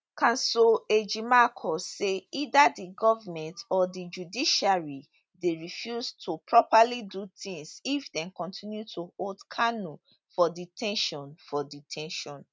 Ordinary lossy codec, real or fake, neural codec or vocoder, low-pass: none; real; none; none